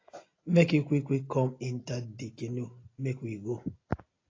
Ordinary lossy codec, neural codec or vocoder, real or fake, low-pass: AAC, 32 kbps; none; real; 7.2 kHz